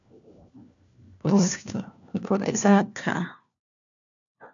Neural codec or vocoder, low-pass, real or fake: codec, 16 kHz, 1 kbps, FunCodec, trained on LibriTTS, 50 frames a second; 7.2 kHz; fake